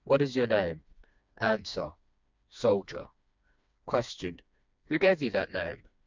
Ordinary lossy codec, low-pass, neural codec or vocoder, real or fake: MP3, 64 kbps; 7.2 kHz; codec, 16 kHz, 2 kbps, FreqCodec, smaller model; fake